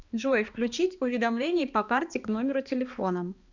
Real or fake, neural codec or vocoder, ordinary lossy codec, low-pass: fake; codec, 16 kHz, 2 kbps, X-Codec, HuBERT features, trained on balanced general audio; Opus, 64 kbps; 7.2 kHz